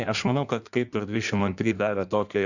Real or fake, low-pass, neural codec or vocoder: fake; 7.2 kHz; codec, 16 kHz in and 24 kHz out, 1.1 kbps, FireRedTTS-2 codec